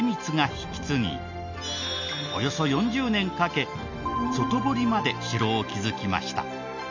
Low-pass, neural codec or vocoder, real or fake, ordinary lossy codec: 7.2 kHz; none; real; none